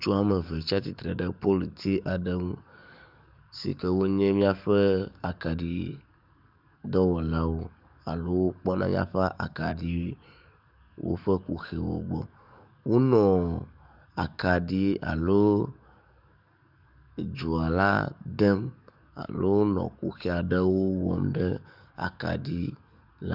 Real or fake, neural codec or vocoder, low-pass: fake; codec, 44.1 kHz, 7.8 kbps, DAC; 5.4 kHz